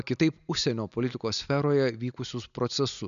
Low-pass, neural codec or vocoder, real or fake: 7.2 kHz; none; real